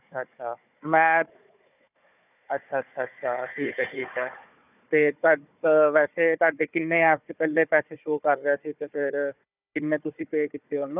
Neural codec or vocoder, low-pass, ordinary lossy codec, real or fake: codec, 16 kHz, 4 kbps, FunCodec, trained on Chinese and English, 50 frames a second; 3.6 kHz; none; fake